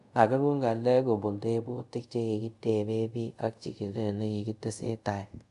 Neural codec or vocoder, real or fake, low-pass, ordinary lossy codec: codec, 24 kHz, 0.5 kbps, DualCodec; fake; 10.8 kHz; AAC, 96 kbps